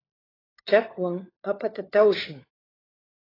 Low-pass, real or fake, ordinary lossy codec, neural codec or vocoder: 5.4 kHz; fake; AAC, 24 kbps; codec, 16 kHz, 4 kbps, FunCodec, trained on LibriTTS, 50 frames a second